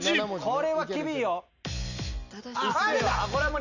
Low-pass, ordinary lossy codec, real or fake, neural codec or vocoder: 7.2 kHz; none; real; none